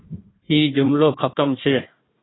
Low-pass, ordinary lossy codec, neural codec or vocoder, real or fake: 7.2 kHz; AAC, 16 kbps; codec, 16 kHz, 1 kbps, FunCodec, trained on Chinese and English, 50 frames a second; fake